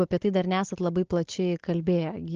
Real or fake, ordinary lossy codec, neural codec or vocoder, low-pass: real; Opus, 16 kbps; none; 7.2 kHz